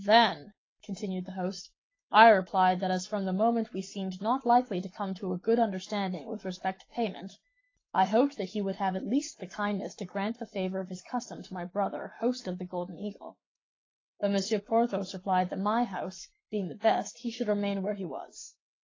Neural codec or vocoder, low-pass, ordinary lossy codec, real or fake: codec, 44.1 kHz, 7.8 kbps, Pupu-Codec; 7.2 kHz; AAC, 32 kbps; fake